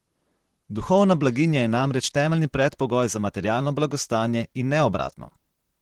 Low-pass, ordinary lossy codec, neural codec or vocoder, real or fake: 19.8 kHz; Opus, 16 kbps; vocoder, 44.1 kHz, 128 mel bands, Pupu-Vocoder; fake